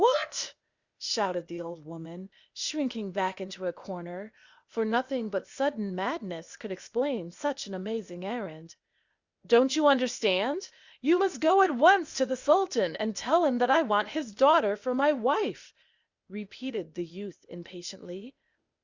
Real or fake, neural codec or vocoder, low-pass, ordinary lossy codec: fake; codec, 16 kHz, 0.8 kbps, ZipCodec; 7.2 kHz; Opus, 64 kbps